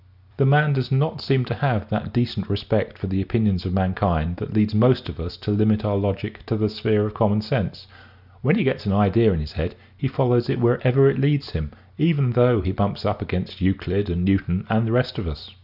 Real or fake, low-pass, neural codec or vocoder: real; 5.4 kHz; none